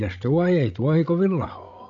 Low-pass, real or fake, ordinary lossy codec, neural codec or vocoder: 7.2 kHz; fake; none; codec, 16 kHz, 16 kbps, FreqCodec, larger model